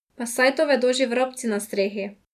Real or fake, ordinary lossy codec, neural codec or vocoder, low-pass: real; none; none; 14.4 kHz